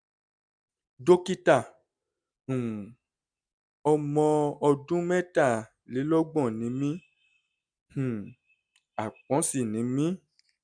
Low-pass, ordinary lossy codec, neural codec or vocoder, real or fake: 9.9 kHz; none; none; real